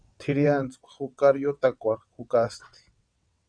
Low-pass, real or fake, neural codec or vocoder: 9.9 kHz; fake; vocoder, 22.05 kHz, 80 mel bands, WaveNeXt